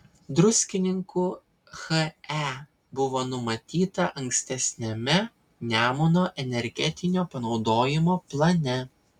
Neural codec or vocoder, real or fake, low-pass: none; real; 19.8 kHz